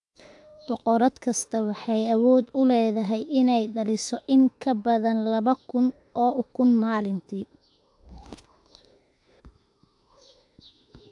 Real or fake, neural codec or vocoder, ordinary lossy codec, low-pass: fake; autoencoder, 48 kHz, 32 numbers a frame, DAC-VAE, trained on Japanese speech; AAC, 64 kbps; 10.8 kHz